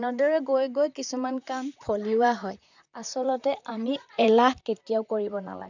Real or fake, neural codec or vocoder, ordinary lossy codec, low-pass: fake; vocoder, 44.1 kHz, 128 mel bands, Pupu-Vocoder; none; 7.2 kHz